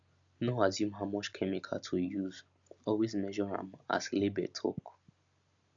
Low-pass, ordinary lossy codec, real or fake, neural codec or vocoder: 7.2 kHz; none; real; none